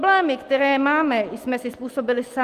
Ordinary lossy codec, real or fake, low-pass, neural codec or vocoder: Opus, 32 kbps; real; 14.4 kHz; none